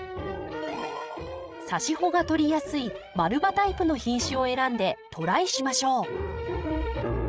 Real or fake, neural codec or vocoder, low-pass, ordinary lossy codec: fake; codec, 16 kHz, 16 kbps, FreqCodec, larger model; none; none